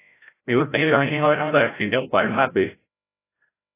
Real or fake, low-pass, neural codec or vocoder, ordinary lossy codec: fake; 3.6 kHz; codec, 16 kHz, 0.5 kbps, FreqCodec, larger model; AAC, 16 kbps